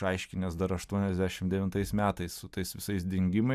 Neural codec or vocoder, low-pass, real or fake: none; 14.4 kHz; real